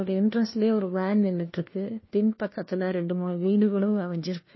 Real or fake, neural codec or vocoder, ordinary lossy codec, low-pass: fake; codec, 16 kHz, 1 kbps, FunCodec, trained on LibriTTS, 50 frames a second; MP3, 24 kbps; 7.2 kHz